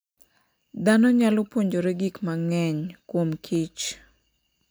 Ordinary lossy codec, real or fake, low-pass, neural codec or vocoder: none; real; none; none